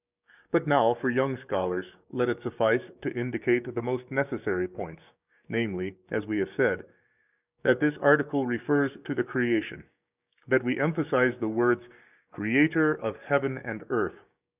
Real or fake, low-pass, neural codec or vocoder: fake; 3.6 kHz; codec, 16 kHz, 6 kbps, DAC